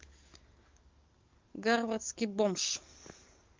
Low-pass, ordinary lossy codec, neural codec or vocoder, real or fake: 7.2 kHz; Opus, 32 kbps; codec, 44.1 kHz, 7.8 kbps, DAC; fake